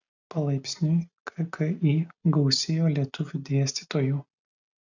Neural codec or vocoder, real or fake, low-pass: none; real; 7.2 kHz